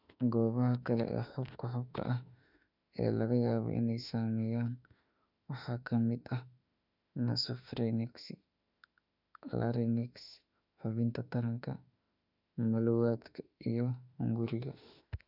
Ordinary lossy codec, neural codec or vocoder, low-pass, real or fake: none; autoencoder, 48 kHz, 32 numbers a frame, DAC-VAE, trained on Japanese speech; 5.4 kHz; fake